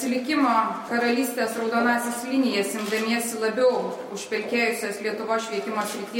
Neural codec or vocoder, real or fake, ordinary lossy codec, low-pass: none; real; MP3, 64 kbps; 19.8 kHz